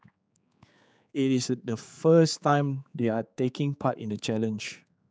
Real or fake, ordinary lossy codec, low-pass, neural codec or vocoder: fake; none; none; codec, 16 kHz, 4 kbps, X-Codec, HuBERT features, trained on general audio